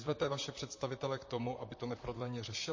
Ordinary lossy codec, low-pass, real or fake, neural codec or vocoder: MP3, 32 kbps; 7.2 kHz; fake; vocoder, 44.1 kHz, 128 mel bands, Pupu-Vocoder